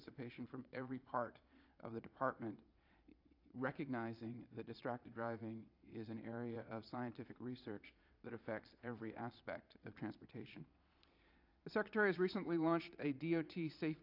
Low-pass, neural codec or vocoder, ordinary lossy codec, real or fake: 5.4 kHz; vocoder, 22.05 kHz, 80 mel bands, Vocos; AAC, 48 kbps; fake